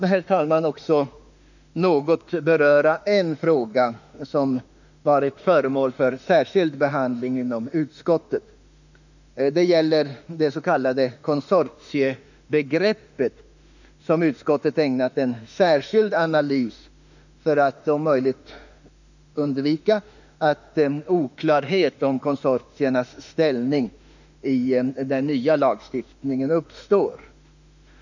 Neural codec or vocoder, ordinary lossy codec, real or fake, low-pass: autoencoder, 48 kHz, 32 numbers a frame, DAC-VAE, trained on Japanese speech; none; fake; 7.2 kHz